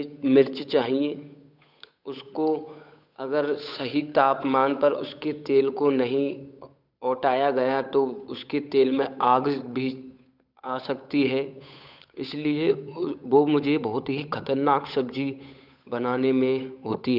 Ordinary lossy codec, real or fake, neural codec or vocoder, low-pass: none; fake; codec, 16 kHz, 8 kbps, FunCodec, trained on Chinese and English, 25 frames a second; 5.4 kHz